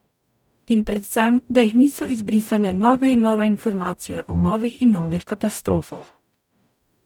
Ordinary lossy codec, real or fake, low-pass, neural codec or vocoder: none; fake; 19.8 kHz; codec, 44.1 kHz, 0.9 kbps, DAC